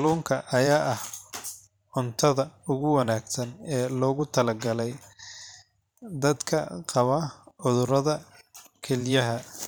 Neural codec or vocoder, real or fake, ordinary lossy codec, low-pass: vocoder, 44.1 kHz, 128 mel bands every 512 samples, BigVGAN v2; fake; none; none